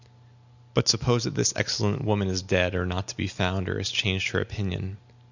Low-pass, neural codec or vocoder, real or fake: 7.2 kHz; none; real